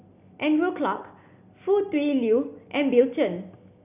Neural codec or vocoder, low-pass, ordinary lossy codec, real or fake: none; 3.6 kHz; none; real